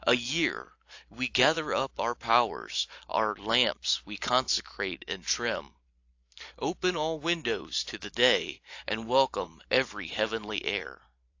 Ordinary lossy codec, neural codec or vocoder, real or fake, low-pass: AAC, 48 kbps; vocoder, 44.1 kHz, 128 mel bands every 512 samples, BigVGAN v2; fake; 7.2 kHz